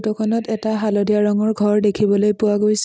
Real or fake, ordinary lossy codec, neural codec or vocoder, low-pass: real; none; none; none